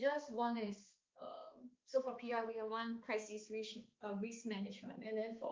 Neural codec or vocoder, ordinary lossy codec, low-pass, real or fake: codec, 16 kHz, 2 kbps, X-Codec, HuBERT features, trained on balanced general audio; Opus, 24 kbps; 7.2 kHz; fake